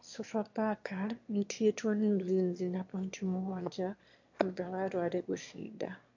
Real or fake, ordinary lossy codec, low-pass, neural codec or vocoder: fake; MP3, 48 kbps; 7.2 kHz; autoencoder, 22.05 kHz, a latent of 192 numbers a frame, VITS, trained on one speaker